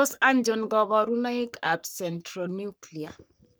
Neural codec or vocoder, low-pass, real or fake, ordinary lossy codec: codec, 44.1 kHz, 3.4 kbps, Pupu-Codec; none; fake; none